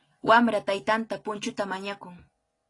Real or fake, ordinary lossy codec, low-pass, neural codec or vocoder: real; AAC, 32 kbps; 10.8 kHz; none